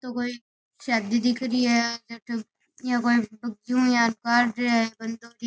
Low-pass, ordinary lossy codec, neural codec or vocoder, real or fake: none; none; none; real